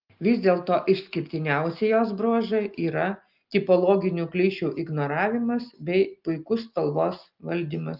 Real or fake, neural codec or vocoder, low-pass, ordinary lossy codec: real; none; 5.4 kHz; Opus, 24 kbps